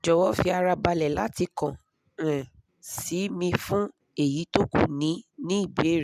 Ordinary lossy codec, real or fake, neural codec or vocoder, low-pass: none; real; none; 14.4 kHz